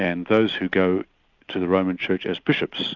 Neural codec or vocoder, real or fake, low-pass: none; real; 7.2 kHz